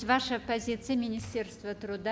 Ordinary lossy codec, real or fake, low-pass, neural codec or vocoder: none; real; none; none